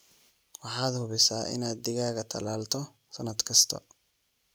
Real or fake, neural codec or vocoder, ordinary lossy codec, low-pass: real; none; none; none